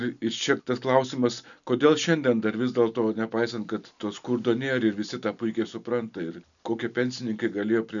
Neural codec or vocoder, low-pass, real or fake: none; 7.2 kHz; real